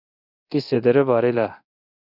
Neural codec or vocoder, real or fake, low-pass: codec, 24 kHz, 0.9 kbps, DualCodec; fake; 5.4 kHz